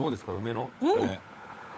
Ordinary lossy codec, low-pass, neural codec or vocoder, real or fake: none; none; codec, 16 kHz, 16 kbps, FunCodec, trained on LibriTTS, 50 frames a second; fake